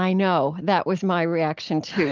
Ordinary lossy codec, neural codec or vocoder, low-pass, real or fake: Opus, 24 kbps; none; 7.2 kHz; real